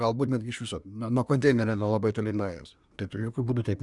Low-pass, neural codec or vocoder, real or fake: 10.8 kHz; codec, 24 kHz, 1 kbps, SNAC; fake